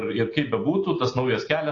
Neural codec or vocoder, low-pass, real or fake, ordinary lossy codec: none; 7.2 kHz; real; AAC, 32 kbps